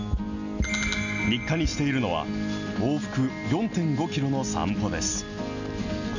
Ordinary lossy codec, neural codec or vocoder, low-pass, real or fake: none; none; 7.2 kHz; real